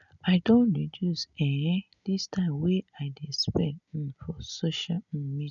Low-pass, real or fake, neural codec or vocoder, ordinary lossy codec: 7.2 kHz; real; none; none